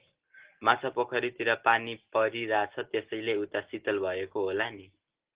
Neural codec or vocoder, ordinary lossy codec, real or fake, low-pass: none; Opus, 16 kbps; real; 3.6 kHz